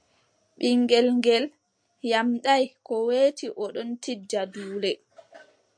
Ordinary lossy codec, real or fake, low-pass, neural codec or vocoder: MP3, 64 kbps; real; 9.9 kHz; none